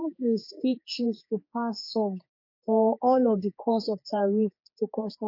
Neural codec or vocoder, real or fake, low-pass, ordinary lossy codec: codec, 16 kHz, 4 kbps, X-Codec, HuBERT features, trained on general audio; fake; 5.4 kHz; MP3, 32 kbps